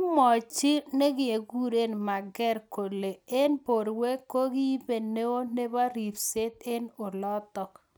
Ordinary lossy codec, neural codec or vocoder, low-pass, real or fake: none; none; none; real